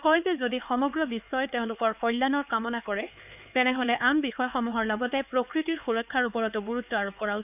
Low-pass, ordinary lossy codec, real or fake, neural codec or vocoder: 3.6 kHz; none; fake; codec, 16 kHz, 4 kbps, X-Codec, HuBERT features, trained on LibriSpeech